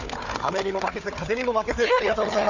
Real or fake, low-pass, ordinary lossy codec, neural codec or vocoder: fake; 7.2 kHz; none; codec, 16 kHz, 4 kbps, FunCodec, trained on Chinese and English, 50 frames a second